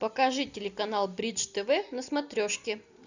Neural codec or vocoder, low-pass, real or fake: none; 7.2 kHz; real